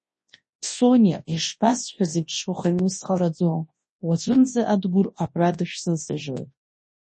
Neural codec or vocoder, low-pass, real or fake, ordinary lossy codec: codec, 24 kHz, 0.9 kbps, WavTokenizer, large speech release; 10.8 kHz; fake; MP3, 32 kbps